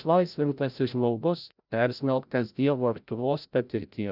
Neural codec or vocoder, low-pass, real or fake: codec, 16 kHz, 0.5 kbps, FreqCodec, larger model; 5.4 kHz; fake